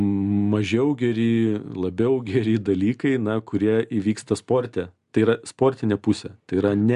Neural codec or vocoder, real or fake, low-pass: none; real; 10.8 kHz